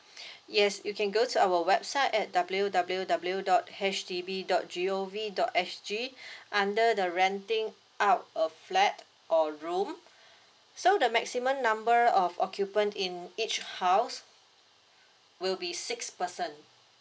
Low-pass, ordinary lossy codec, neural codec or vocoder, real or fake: none; none; none; real